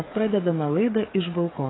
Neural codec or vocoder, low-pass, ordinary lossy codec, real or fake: codec, 16 kHz, 8 kbps, FreqCodec, smaller model; 7.2 kHz; AAC, 16 kbps; fake